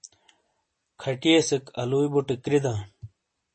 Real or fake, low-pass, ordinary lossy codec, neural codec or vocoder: real; 9.9 kHz; MP3, 32 kbps; none